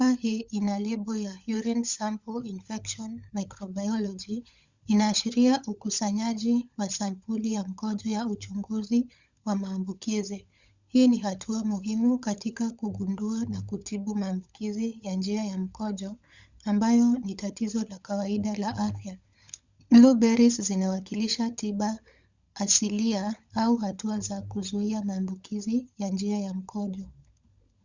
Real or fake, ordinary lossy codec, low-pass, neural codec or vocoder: fake; Opus, 64 kbps; 7.2 kHz; codec, 16 kHz, 16 kbps, FunCodec, trained on LibriTTS, 50 frames a second